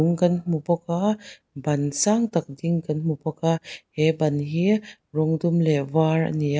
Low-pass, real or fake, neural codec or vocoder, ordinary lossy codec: none; real; none; none